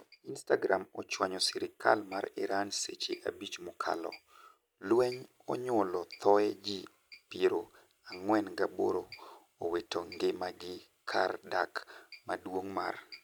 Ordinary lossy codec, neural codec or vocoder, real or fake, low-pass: none; none; real; none